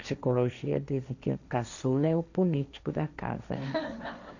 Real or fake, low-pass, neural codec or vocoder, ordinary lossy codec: fake; 7.2 kHz; codec, 16 kHz, 1.1 kbps, Voila-Tokenizer; none